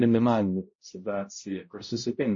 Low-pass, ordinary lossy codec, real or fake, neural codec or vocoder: 7.2 kHz; MP3, 32 kbps; fake; codec, 16 kHz, 0.5 kbps, X-Codec, HuBERT features, trained on balanced general audio